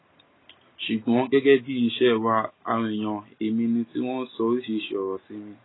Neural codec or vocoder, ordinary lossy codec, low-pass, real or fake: none; AAC, 16 kbps; 7.2 kHz; real